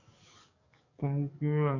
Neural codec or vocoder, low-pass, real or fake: codec, 44.1 kHz, 7.8 kbps, DAC; 7.2 kHz; fake